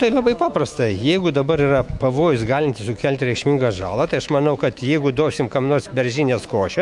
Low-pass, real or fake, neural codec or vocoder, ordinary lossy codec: 10.8 kHz; fake; autoencoder, 48 kHz, 128 numbers a frame, DAC-VAE, trained on Japanese speech; MP3, 64 kbps